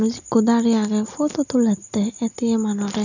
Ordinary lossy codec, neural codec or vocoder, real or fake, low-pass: none; none; real; 7.2 kHz